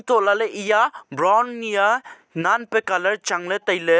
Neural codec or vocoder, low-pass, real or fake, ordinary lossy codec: none; none; real; none